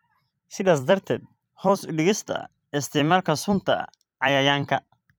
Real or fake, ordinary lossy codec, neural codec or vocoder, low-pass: fake; none; vocoder, 44.1 kHz, 128 mel bands every 256 samples, BigVGAN v2; none